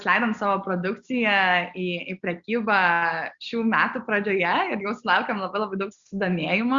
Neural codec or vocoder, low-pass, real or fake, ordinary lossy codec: none; 7.2 kHz; real; Opus, 64 kbps